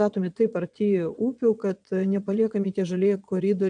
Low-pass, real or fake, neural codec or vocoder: 9.9 kHz; fake; vocoder, 22.05 kHz, 80 mel bands, Vocos